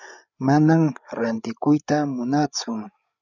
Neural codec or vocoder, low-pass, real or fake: codec, 16 kHz, 8 kbps, FreqCodec, larger model; 7.2 kHz; fake